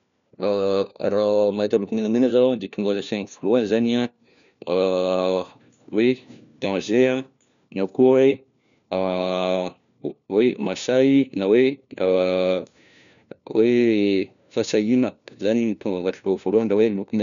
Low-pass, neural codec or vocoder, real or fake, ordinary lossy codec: 7.2 kHz; codec, 16 kHz, 1 kbps, FunCodec, trained on LibriTTS, 50 frames a second; fake; MP3, 96 kbps